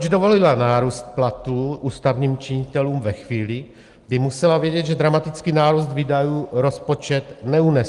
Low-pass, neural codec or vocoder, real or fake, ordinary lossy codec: 10.8 kHz; none; real; Opus, 24 kbps